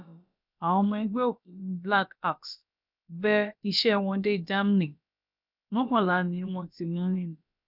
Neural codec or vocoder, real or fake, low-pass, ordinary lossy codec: codec, 16 kHz, about 1 kbps, DyCAST, with the encoder's durations; fake; 5.4 kHz; none